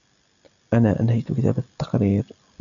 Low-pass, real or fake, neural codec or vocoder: 7.2 kHz; real; none